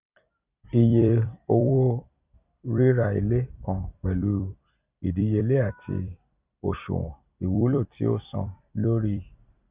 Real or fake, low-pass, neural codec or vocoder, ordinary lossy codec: fake; 3.6 kHz; vocoder, 44.1 kHz, 128 mel bands every 256 samples, BigVGAN v2; Opus, 64 kbps